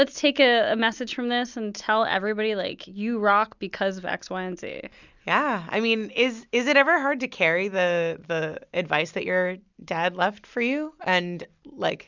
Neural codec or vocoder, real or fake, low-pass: none; real; 7.2 kHz